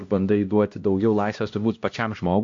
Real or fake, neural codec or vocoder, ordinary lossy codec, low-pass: fake; codec, 16 kHz, 1 kbps, X-Codec, HuBERT features, trained on LibriSpeech; AAC, 48 kbps; 7.2 kHz